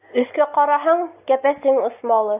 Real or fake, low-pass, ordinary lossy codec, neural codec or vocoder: real; 3.6 kHz; AAC, 32 kbps; none